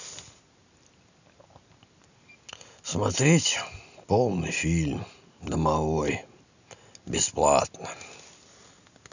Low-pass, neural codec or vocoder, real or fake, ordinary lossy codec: 7.2 kHz; none; real; none